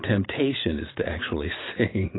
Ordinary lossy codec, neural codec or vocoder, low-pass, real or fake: AAC, 16 kbps; vocoder, 44.1 kHz, 128 mel bands every 512 samples, BigVGAN v2; 7.2 kHz; fake